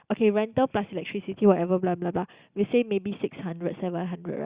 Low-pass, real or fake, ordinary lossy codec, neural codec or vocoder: 3.6 kHz; real; Opus, 64 kbps; none